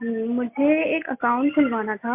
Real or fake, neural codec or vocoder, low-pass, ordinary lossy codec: real; none; 3.6 kHz; MP3, 32 kbps